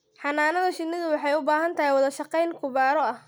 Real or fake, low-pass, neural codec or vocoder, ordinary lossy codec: real; none; none; none